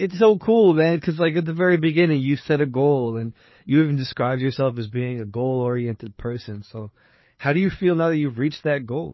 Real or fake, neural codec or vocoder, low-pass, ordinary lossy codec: fake; codec, 16 kHz, 4 kbps, FreqCodec, larger model; 7.2 kHz; MP3, 24 kbps